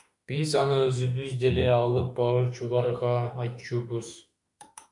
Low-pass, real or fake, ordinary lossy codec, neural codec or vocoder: 10.8 kHz; fake; MP3, 96 kbps; autoencoder, 48 kHz, 32 numbers a frame, DAC-VAE, trained on Japanese speech